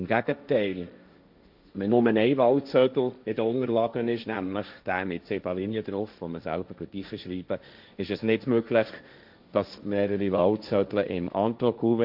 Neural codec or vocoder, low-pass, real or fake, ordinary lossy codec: codec, 16 kHz, 1.1 kbps, Voila-Tokenizer; 5.4 kHz; fake; none